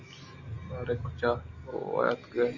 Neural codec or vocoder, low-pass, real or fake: none; 7.2 kHz; real